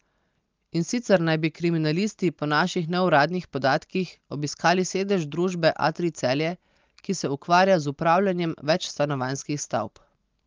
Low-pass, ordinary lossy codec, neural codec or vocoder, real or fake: 7.2 kHz; Opus, 24 kbps; none; real